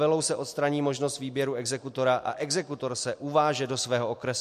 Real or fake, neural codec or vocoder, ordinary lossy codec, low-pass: real; none; MP3, 64 kbps; 14.4 kHz